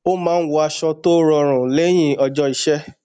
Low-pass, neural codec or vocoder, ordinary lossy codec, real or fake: 9.9 kHz; none; none; real